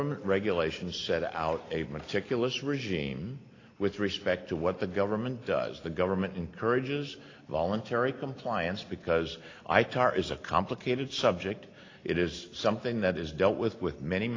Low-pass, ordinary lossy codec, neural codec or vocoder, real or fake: 7.2 kHz; AAC, 32 kbps; none; real